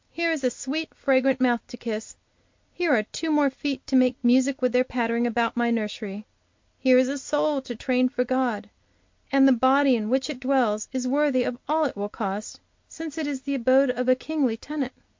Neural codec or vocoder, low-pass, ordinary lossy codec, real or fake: none; 7.2 kHz; MP3, 48 kbps; real